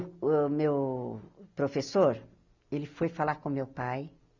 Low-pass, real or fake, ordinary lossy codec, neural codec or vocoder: 7.2 kHz; real; none; none